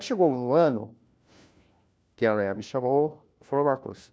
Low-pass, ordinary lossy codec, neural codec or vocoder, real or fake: none; none; codec, 16 kHz, 1 kbps, FunCodec, trained on LibriTTS, 50 frames a second; fake